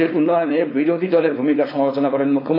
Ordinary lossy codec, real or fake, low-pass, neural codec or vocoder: AAC, 32 kbps; fake; 5.4 kHz; codec, 16 kHz, 4.8 kbps, FACodec